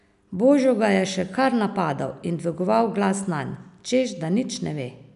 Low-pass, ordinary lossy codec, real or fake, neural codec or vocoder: 10.8 kHz; none; real; none